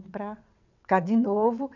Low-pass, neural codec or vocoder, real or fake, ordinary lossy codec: 7.2 kHz; vocoder, 22.05 kHz, 80 mel bands, WaveNeXt; fake; none